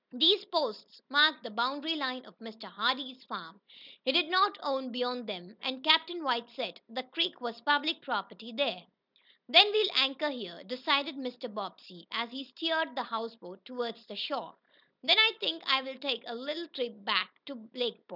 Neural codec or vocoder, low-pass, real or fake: none; 5.4 kHz; real